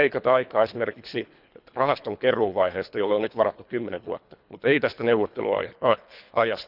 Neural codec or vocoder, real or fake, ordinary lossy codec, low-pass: codec, 24 kHz, 3 kbps, HILCodec; fake; none; 5.4 kHz